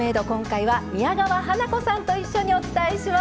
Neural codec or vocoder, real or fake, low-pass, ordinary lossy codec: none; real; none; none